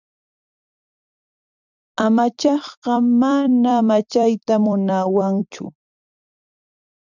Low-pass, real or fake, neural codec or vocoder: 7.2 kHz; fake; vocoder, 44.1 kHz, 128 mel bands every 256 samples, BigVGAN v2